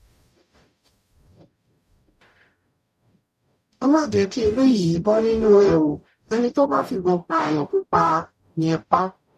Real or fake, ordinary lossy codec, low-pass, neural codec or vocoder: fake; none; 14.4 kHz; codec, 44.1 kHz, 0.9 kbps, DAC